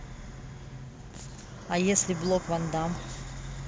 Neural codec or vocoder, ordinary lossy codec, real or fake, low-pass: none; none; real; none